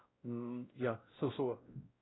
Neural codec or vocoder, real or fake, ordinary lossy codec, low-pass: codec, 16 kHz, 0.5 kbps, X-Codec, WavLM features, trained on Multilingual LibriSpeech; fake; AAC, 16 kbps; 7.2 kHz